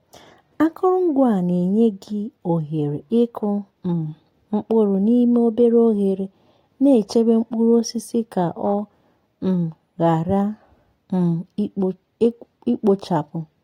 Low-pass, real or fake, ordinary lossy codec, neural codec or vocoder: 19.8 kHz; real; AAC, 48 kbps; none